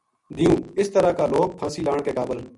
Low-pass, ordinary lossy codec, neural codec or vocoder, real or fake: 10.8 kHz; MP3, 96 kbps; none; real